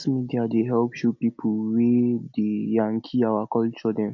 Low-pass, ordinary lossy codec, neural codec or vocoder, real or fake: 7.2 kHz; none; none; real